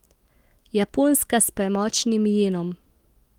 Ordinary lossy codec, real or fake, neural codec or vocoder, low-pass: Opus, 24 kbps; fake; autoencoder, 48 kHz, 128 numbers a frame, DAC-VAE, trained on Japanese speech; 19.8 kHz